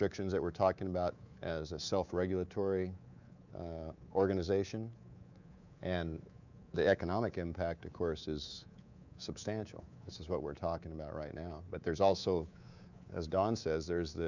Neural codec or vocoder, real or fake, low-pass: codec, 24 kHz, 3.1 kbps, DualCodec; fake; 7.2 kHz